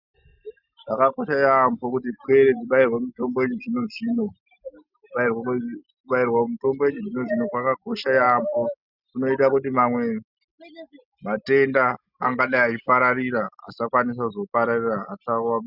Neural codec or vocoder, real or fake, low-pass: none; real; 5.4 kHz